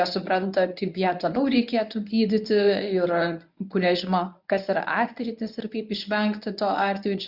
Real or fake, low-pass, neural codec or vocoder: fake; 5.4 kHz; codec, 24 kHz, 0.9 kbps, WavTokenizer, medium speech release version 1